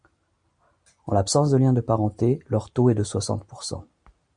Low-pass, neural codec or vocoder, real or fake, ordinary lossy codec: 9.9 kHz; none; real; MP3, 64 kbps